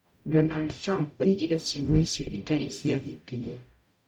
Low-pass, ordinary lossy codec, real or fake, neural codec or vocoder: 19.8 kHz; none; fake; codec, 44.1 kHz, 0.9 kbps, DAC